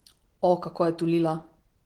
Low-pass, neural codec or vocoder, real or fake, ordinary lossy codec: 19.8 kHz; none; real; Opus, 16 kbps